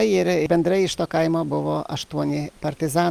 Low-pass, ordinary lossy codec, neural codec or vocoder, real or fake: 14.4 kHz; Opus, 32 kbps; none; real